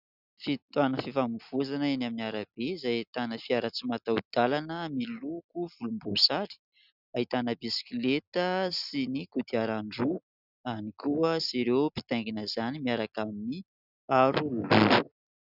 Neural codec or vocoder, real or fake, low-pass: none; real; 5.4 kHz